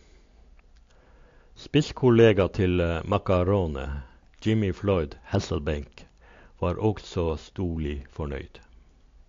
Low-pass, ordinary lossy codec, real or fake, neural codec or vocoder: 7.2 kHz; AAC, 48 kbps; real; none